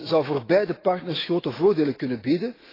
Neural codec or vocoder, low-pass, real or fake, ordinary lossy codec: autoencoder, 48 kHz, 128 numbers a frame, DAC-VAE, trained on Japanese speech; 5.4 kHz; fake; AAC, 24 kbps